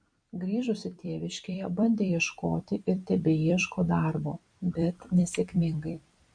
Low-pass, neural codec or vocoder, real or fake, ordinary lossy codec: 9.9 kHz; vocoder, 44.1 kHz, 128 mel bands every 256 samples, BigVGAN v2; fake; MP3, 48 kbps